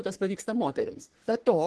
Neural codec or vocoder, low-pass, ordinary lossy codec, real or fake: codec, 44.1 kHz, 3.4 kbps, Pupu-Codec; 10.8 kHz; Opus, 16 kbps; fake